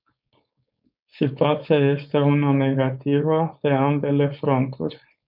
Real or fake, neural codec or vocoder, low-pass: fake; codec, 16 kHz, 4.8 kbps, FACodec; 5.4 kHz